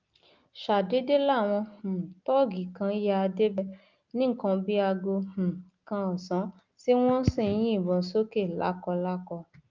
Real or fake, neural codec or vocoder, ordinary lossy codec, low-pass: real; none; Opus, 32 kbps; 7.2 kHz